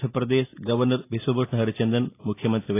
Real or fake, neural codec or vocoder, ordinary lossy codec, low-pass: real; none; AAC, 24 kbps; 3.6 kHz